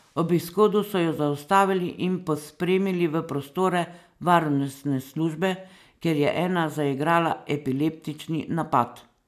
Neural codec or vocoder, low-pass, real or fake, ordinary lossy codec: none; 14.4 kHz; real; none